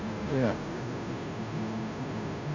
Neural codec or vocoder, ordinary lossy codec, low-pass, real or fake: codec, 16 kHz, 0.5 kbps, FunCodec, trained on Chinese and English, 25 frames a second; MP3, 64 kbps; 7.2 kHz; fake